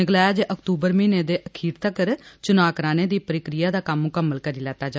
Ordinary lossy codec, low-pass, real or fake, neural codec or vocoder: none; none; real; none